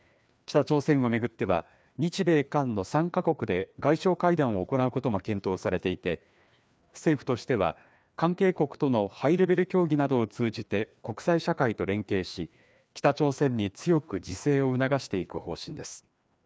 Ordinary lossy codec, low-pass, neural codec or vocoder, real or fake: none; none; codec, 16 kHz, 2 kbps, FreqCodec, larger model; fake